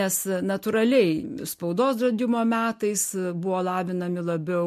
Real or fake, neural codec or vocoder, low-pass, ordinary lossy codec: real; none; 14.4 kHz; MP3, 64 kbps